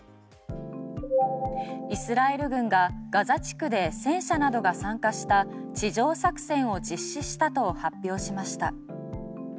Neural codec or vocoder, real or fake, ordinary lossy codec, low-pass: none; real; none; none